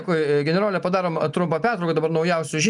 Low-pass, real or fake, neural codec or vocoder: 10.8 kHz; real; none